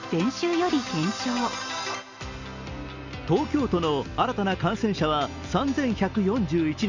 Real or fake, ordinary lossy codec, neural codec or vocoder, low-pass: real; none; none; 7.2 kHz